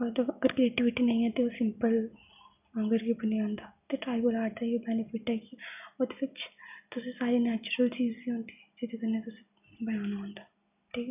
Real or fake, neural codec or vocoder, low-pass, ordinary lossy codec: real; none; 3.6 kHz; none